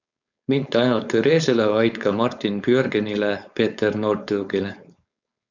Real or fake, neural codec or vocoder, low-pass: fake; codec, 16 kHz, 4.8 kbps, FACodec; 7.2 kHz